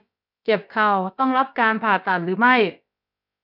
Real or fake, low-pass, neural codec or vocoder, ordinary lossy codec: fake; 5.4 kHz; codec, 16 kHz, about 1 kbps, DyCAST, with the encoder's durations; none